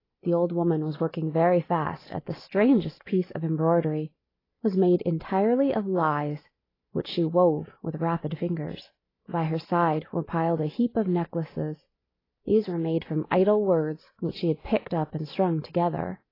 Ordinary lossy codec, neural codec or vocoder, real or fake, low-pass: AAC, 24 kbps; none; real; 5.4 kHz